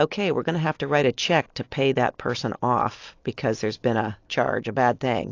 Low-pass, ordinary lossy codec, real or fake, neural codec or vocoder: 7.2 kHz; AAC, 48 kbps; real; none